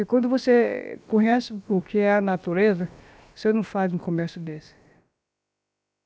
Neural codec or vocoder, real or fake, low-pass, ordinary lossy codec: codec, 16 kHz, about 1 kbps, DyCAST, with the encoder's durations; fake; none; none